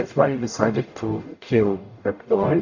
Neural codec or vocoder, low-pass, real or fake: codec, 44.1 kHz, 0.9 kbps, DAC; 7.2 kHz; fake